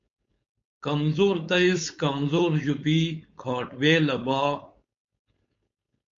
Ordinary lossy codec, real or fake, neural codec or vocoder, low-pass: MP3, 48 kbps; fake; codec, 16 kHz, 4.8 kbps, FACodec; 7.2 kHz